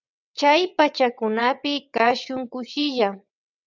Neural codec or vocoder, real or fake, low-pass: vocoder, 22.05 kHz, 80 mel bands, WaveNeXt; fake; 7.2 kHz